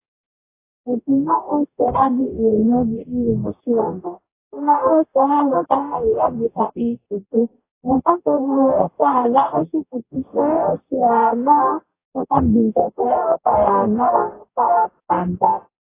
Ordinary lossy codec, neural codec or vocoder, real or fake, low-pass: AAC, 24 kbps; codec, 44.1 kHz, 0.9 kbps, DAC; fake; 3.6 kHz